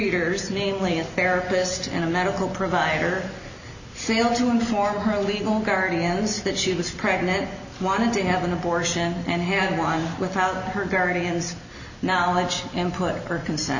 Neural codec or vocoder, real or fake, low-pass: none; real; 7.2 kHz